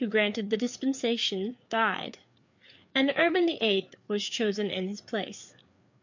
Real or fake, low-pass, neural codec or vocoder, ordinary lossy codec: fake; 7.2 kHz; codec, 16 kHz, 4 kbps, FreqCodec, larger model; MP3, 64 kbps